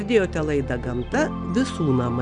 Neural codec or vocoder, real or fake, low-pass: none; real; 9.9 kHz